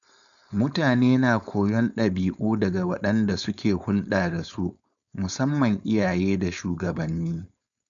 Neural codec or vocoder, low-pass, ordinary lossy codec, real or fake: codec, 16 kHz, 4.8 kbps, FACodec; 7.2 kHz; none; fake